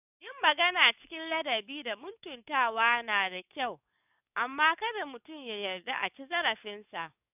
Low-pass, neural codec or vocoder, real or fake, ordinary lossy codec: 3.6 kHz; codec, 44.1 kHz, 7.8 kbps, DAC; fake; none